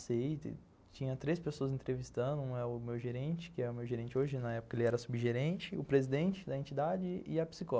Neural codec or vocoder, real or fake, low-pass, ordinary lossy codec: none; real; none; none